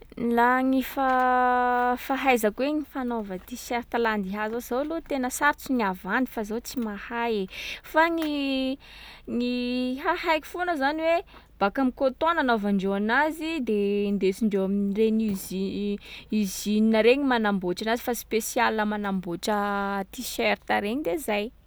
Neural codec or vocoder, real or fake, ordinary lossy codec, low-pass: none; real; none; none